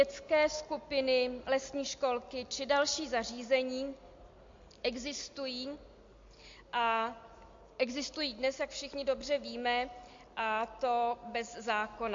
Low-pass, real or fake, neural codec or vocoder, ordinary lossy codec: 7.2 kHz; real; none; MP3, 48 kbps